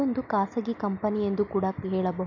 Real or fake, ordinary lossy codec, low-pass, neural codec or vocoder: real; none; 7.2 kHz; none